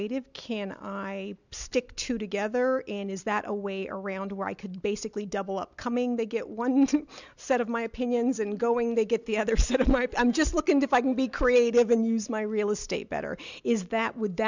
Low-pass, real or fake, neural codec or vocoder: 7.2 kHz; real; none